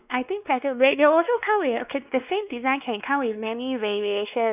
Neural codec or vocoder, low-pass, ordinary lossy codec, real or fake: codec, 16 kHz, 2 kbps, X-Codec, WavLM features, trained on Multilingual LibriSpeech; 3.6 kHz; none; fake